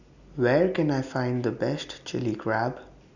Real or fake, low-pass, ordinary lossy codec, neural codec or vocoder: real; 7.2 kHz; none; none